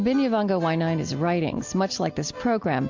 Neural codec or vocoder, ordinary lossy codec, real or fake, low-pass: none; AAC, 48 kbps; real; 7.2 kHz